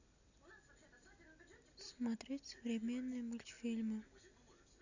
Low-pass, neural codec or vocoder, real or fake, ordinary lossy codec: 7.2 kHz; none; real; none